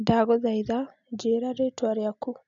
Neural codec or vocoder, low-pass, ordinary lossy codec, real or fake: none; 7.2 kHz; none; real